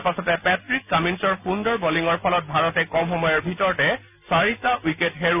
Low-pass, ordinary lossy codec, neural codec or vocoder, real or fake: 3.6 kHz; none; none; real